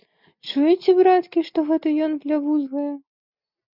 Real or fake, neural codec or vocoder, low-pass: real; none; 5.4 kHz